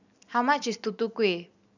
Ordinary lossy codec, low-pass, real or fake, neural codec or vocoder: none; 7.2 kHz; real; none